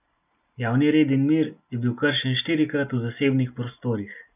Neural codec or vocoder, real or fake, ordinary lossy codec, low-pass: none; real; none; 3.6 kHz